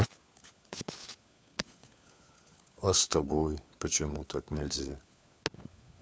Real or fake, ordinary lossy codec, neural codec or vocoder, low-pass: fake; none; codec, 16 kHz, 4 kbps, FunCodec, trained on LibriTTS, 50 frames a second; none